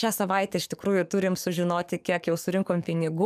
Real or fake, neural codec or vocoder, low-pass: fake; codec, 44.1 kHz, 7.8 kbps, DAC; 14.4 kHz